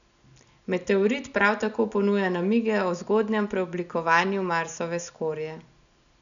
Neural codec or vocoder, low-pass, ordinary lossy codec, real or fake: none; 7.2 kHz; none; real